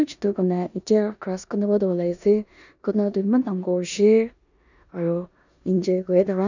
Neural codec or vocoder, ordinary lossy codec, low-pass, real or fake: codec, 16 kHz in and 24 kHz out, 0.9 kbps, LongCat-Audio-Codec, four codebook decoder; none; 7.2 kHz; fake